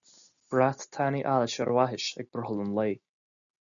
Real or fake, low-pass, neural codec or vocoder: real; 7.2 kHz; none